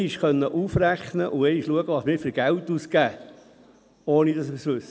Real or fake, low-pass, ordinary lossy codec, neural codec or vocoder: real; none; none; none